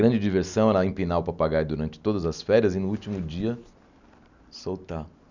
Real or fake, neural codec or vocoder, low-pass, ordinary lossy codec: real; none; 7.2 kHz; none